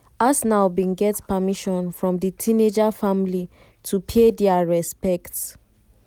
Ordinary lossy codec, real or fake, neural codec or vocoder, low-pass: none; real; none; none